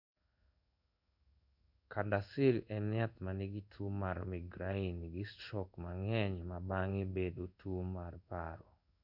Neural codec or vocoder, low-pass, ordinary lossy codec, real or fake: codec, 16 kHz in and 24 kHz out, 1 kbps, XY-Tokenizer; 5.4 kHz; none; fake